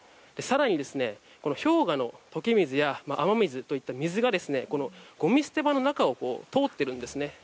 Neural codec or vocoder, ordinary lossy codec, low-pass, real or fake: none; none; none; real